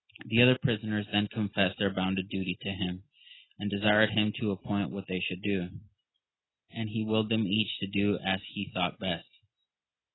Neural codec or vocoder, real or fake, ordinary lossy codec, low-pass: none; real; AAC, 16 kbps; 7.2 kHz